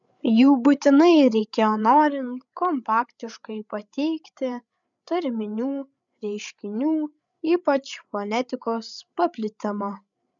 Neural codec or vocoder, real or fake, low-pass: codec, 16 kHz, 8 kbps, FreqCodec, larger model; fake; 7.2 kHz